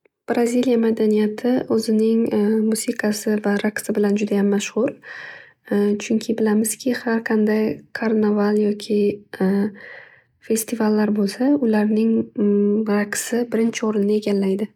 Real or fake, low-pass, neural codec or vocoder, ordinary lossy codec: real; 19.8 kHz; none; none